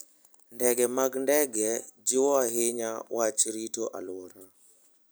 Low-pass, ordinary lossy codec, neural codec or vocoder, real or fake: none; none; none; real